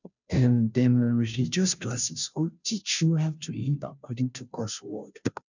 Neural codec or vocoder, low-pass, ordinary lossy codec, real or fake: codec, 16 kHz, 0.5 kbps, FunCodec, trained on Chinese and English, 25 frames a second; 7.2 kHz; none; fake